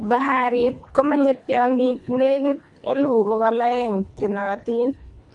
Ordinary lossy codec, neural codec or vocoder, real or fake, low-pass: AAC, 64 kbps; codec, 24 kHz, 1.5 kbps, HILCodec; fake; 10.8 kHz